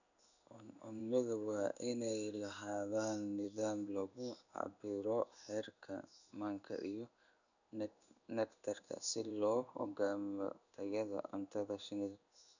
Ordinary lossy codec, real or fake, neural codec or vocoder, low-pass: none; fake; codec, 16 kHz in and 24 kHz out, 1 kbps, XY-Tokenizer; 7.2 kHz